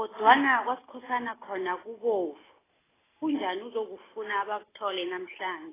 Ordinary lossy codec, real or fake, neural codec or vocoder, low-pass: AAC, 16 kbps; real; none; 3.6 kHz